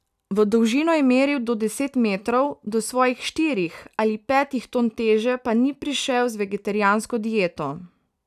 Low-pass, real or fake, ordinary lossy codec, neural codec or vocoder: 14.4 kHz; real; none; none